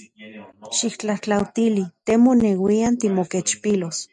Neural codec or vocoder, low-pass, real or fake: none; 10.8 kHz; real